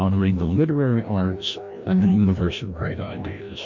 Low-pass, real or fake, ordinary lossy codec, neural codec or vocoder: 7.2 kHz; fake; MP3, 48 kbps; codec, 16 kHz, 1 kbps, FreqCodec, larger model